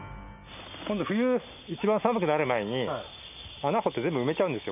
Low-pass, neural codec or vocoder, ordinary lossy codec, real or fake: 3.6 kHz; none; none; real